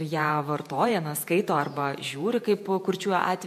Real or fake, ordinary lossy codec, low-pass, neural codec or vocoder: fake; MP3, 64 kbps; 14.4 kHz; vocoder, 48 kHz, 128 mel bands, Vocos